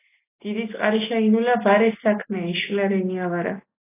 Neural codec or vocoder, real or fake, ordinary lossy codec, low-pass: none; real; AAC, 24 kbps; 3.6 kHz